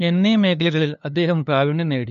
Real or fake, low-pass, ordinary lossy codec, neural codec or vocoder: fake; 7.2 kHz; none; codec, 16 kHz, 2 kbps, FunCodec, trained on LibriTTS, 25 frames a second